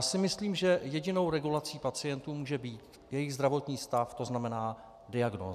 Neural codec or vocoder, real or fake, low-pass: none; real; 14.4 kHz